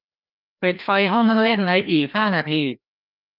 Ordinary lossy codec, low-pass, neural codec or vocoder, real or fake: none; 5.4 kHz; codec, 16 kHz, 1 kbps, FreqCodec, larger model; fake